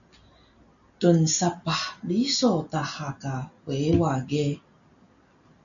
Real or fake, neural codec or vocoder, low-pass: real; none; 7.2 kHz